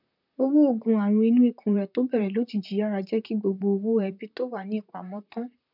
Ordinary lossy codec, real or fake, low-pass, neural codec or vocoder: none; fake; 5.4 kHz; vocoder, 44.1 kHz, 128 mel bands, Pupu-Vocoder